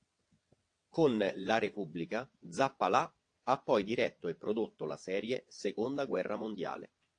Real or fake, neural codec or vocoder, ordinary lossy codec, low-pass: fake; vocoder, 22.05 kHz, 80 mel bands, WaveNeXt; AAC, 48 kbps; 9.9 kHz